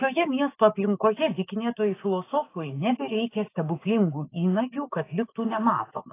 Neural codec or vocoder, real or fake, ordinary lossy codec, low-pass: codec, 16 kHz, 6 kbps, DAC; fake; AAC, 24 kbps; 3.6 kHz